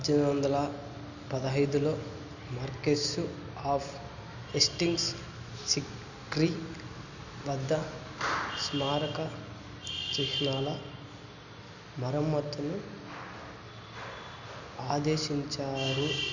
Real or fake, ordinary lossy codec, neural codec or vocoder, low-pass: real; none; none; 7.2 kHz